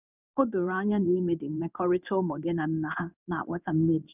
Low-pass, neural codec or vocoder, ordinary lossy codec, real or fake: 3.6 kHz; codec, 16 kHz in and 24 kHz out, 1 kbps, XY-Tokenizer; none; fake